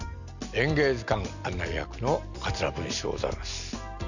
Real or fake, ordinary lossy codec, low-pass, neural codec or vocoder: real; none; 7.2 kHz; none